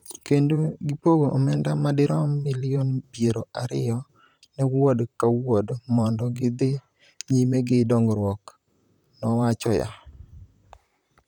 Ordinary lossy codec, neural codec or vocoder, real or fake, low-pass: none; vocoder, 44.1 kHz, 128 mel bands, Pupu-Vocoder; fake; 19.8 kHz